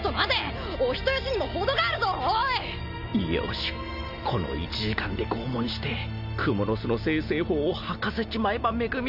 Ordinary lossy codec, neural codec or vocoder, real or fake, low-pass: none; none; real; 5.4 kHz